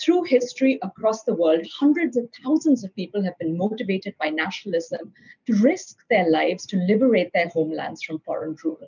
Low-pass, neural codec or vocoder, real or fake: 7.2 kHz; none; real